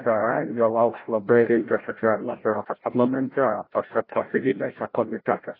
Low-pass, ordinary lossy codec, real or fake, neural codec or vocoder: 5.4 kHz; AAC, 24 kbps; fake; codec, 16 kHz, 0.5 kbps, FreqCodec, larger model